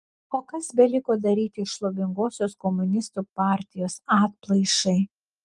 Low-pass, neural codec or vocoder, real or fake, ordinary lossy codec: 10.8 kHz; none; real; Opus, 32 kbps